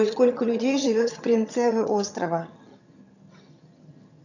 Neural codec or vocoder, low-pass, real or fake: vocoder, 22.05 kHz, 80 mel bands, HiFi-GAN; 7.2 kHz; fake